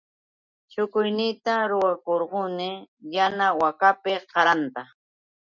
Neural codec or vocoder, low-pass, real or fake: none; 7.2 kHz; real